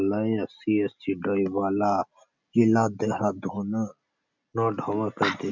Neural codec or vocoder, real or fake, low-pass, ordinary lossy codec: none; real; 7.2 kHz; none